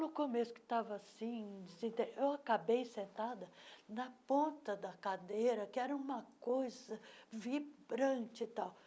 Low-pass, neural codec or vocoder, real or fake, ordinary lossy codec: none; none; real; none